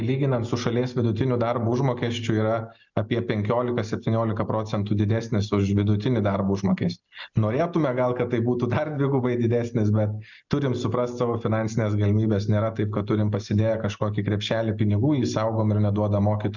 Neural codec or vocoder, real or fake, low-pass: none; real; 7.2 kHz